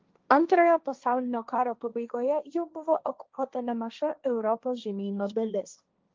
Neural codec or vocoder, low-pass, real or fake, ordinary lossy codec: codec, 16 kHz, 1.1 kbps, Voila-Tokenizer; 7.2 kHz; fake; Opus, 32 kbps